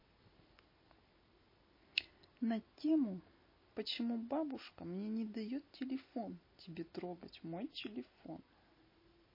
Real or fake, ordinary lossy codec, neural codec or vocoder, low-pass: real; MP3, 24 kbps; none; 5.4 kHz